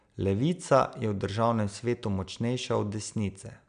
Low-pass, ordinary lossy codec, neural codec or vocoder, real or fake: 9.9 kHz; none; none; real